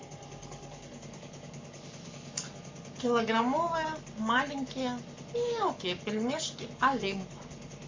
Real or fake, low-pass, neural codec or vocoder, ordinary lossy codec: fake; 7.2 kHz; codec, 44.1 kHz, 7.8 kbps, Pupu-Codec; MP3, 64 kbps